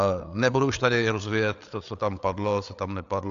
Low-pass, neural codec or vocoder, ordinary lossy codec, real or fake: 7.2 kHz; codec, 16 kHz, 8 kbps, FreqCodec, larger model; AAC, 64 kbps; fake